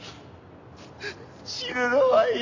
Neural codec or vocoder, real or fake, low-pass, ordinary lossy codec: none; real; 7.2 kHz; AAC, 48 kbps